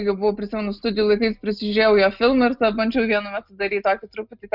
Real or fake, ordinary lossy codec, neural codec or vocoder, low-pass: real; Opus, 64 kbps; none; 5.4 kHz